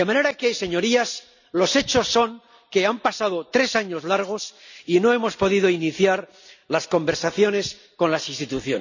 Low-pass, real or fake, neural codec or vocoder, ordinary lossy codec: 7.2 kHz; real; none; none